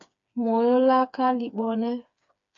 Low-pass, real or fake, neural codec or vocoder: 7.2 kHz; fake; codec, 16 kHz, 4 kbps, FreqCodec, smaller model